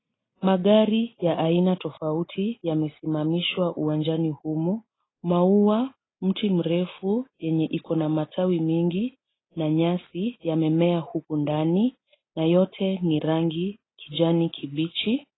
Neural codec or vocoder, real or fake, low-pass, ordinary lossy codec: none; real; 7.2 kHz; AAC, 16 kbps